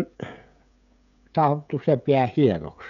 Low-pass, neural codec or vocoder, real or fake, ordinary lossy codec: 7.2 kHz; codec, 16 kHz, 16 kbps, FreqCodec, smaller model; fake; none